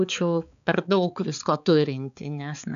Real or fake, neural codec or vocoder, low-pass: fake; codec, 16 kHz, 4 kbps, X-Codec, HuBERT features, trained on balanced general audio; 7.2 kHz